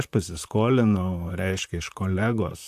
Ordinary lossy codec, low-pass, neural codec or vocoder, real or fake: AAC, 96 kbps; 14.4 kHz; vocoder, 44.1 kHz, 128 mel bands, Pupu-Vocoder; fake